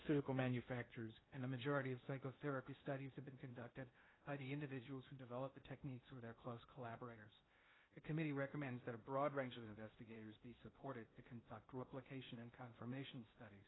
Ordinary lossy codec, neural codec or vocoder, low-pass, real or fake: AAC, 16 kbps; codec, 16 kHz in and 24 kHz out, 0.6 kbps, FocalCodec, streaming, 2048 codes; 7.2 kHz; fake